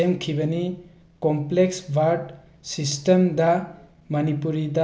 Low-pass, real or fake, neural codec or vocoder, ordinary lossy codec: none; real; none; none